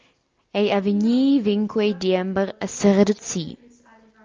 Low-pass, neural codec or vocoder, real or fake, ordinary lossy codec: 7.2 kHz; none; real; Opus, 24 kbps